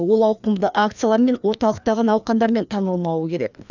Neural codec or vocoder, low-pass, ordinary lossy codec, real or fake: codec, 16 kHz, 2 kbps, FreqCodec, larger model; 7.2 kHz; none; fake